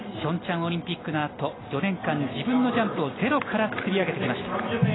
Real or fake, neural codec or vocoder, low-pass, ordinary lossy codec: real; none; 7.2 kHz; AAC, 16 kbps